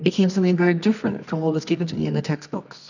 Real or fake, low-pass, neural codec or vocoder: fake; 7.2 kHz; codec, 24 kHz, 0.9 kbps, WavTokenizer, medium music audio release